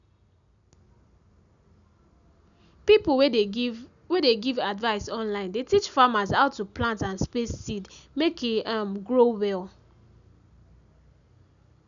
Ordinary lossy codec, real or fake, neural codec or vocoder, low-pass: none; real; none; 7.2 kHz